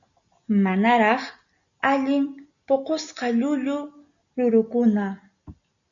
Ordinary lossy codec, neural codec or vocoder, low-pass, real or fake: MP3, 96 kbps; none; 7.2 kHz; real